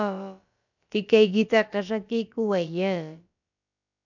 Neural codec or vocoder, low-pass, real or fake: codec, 16 kHz, about 1 kbps, DyCAST, with the encoder's durations; 7.2 kHz; fake